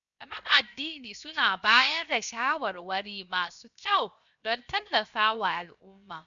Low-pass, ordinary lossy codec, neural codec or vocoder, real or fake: 7.2 kHz; none; codec, 16 kHz, 0.7 kbps, FocalCodec; fake